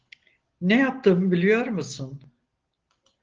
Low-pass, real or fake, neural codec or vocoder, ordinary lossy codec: 7.2 kHz; real; none; Opus, 16 kbps